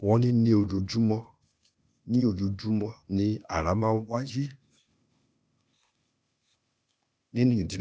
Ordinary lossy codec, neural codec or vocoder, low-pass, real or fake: none; codec, 16 kHz, 0.8 kbps, ZipCodec; none; fake